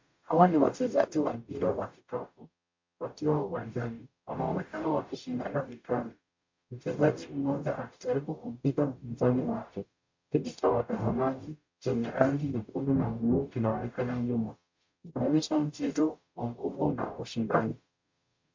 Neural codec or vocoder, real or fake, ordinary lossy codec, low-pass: codec, 44.1 kHz, 0.9 kbps, DAC; fake; MP3, 48 kbps; 7.2 kHz